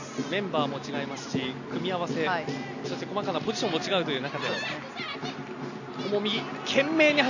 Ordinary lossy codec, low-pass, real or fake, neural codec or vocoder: none; 7.2 kHz; real; none